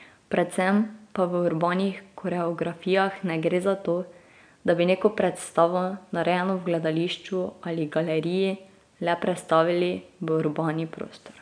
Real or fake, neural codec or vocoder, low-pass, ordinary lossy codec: real; none; 9.9 kHz; none